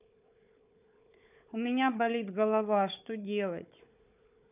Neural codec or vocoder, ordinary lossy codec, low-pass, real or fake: codec, 16 kHz, 4 kbps, FunCodec, trained on Chinese and English, 50 frames a second; none; 3.6 kHz; fake